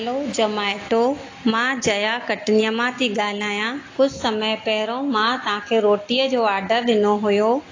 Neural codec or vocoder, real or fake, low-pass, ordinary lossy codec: none; real; 7.2 kHz; AAC, 32 kbps